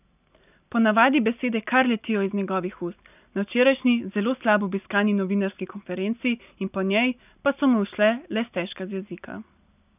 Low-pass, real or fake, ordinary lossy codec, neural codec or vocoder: 3.6 kHz; real; none; none